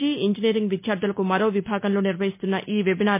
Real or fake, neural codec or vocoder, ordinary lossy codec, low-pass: real; none; MP3, 24 kbps; 3.6 kHz